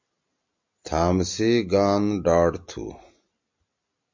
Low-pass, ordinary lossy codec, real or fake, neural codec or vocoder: 7.2 kHz; MP3, 64 kbps; real; none